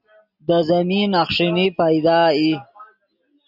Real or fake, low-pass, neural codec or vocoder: real; 5.4 kHz; none